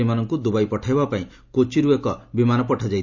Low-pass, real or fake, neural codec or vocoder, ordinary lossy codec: 7.2 kHz; real; none; none